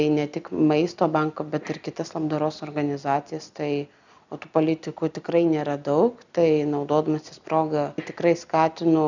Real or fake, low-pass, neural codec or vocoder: real; 7.2 kHz; none